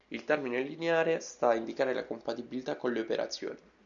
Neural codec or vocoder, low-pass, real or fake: none; 7.2 kHz; real